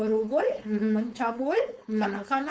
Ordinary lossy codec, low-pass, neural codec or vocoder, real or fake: none; none; codec, 16 kHz, 4.8 kbps, FACodec; fake